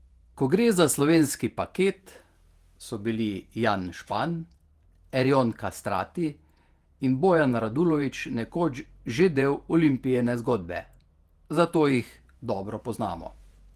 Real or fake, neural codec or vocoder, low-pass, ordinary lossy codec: fake; vocoder, 48 kHz, 128 mel bands, Vocos; 14.4 kHz; Opus, 24 kbps